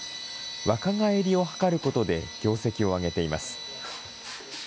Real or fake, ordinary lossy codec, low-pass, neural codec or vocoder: real; none; none; none